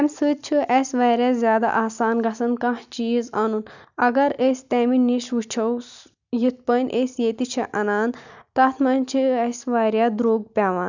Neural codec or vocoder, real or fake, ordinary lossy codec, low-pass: none; real; none; 7.2 kHz